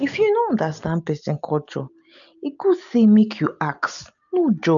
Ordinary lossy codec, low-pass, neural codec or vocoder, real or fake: none; 7.2 kHz; none; real